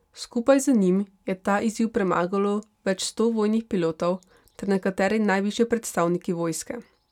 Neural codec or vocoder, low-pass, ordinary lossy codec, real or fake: none; 19.8 kHz; none; real